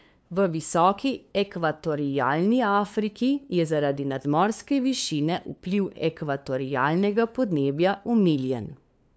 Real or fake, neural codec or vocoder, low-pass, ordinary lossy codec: fake; codec, 16 kHz, 2 kbps, FunCodec, trained on LibriTTS, 25 frames a second; none; none